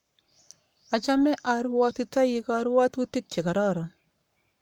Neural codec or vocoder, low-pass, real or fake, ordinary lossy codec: codec, 44.1 kHz, 7.8 kbps, Pupu-Codec; 19.8 kHz; fake; MP3, 96 kbps